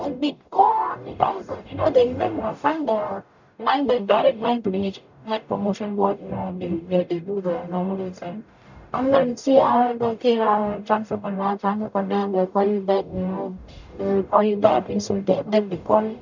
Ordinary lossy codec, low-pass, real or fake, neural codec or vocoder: none; 7.2 kHz; fake; codec, 44.1 kHz, 0.9 kbps, DAC